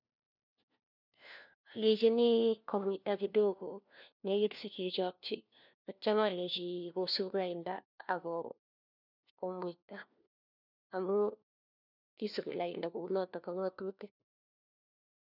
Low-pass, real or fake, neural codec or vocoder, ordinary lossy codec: 5.4 kHz; fake; codec, 16 kHz, 1 kbps, FunCodec, trained on LibriTTS, 50 frames a second; none